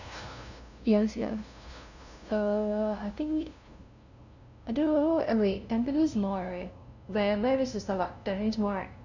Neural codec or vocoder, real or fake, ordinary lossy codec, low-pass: codec, 16 kHz, 0.5 kbps, FunCodec, trained on LibriTTS, 25 frames a second; fake; none; 7.2 kHz